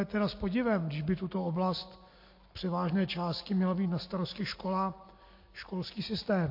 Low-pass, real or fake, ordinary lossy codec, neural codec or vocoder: 5.4 kHz; real; MP3, 32 kbps; none